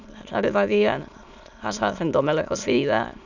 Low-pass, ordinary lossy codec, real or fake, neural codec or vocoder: 7.2 kHz; none; fake; autoencoder, 22.05 kHz, a latent of 192 numbers a frame, VITS, trained on many speakers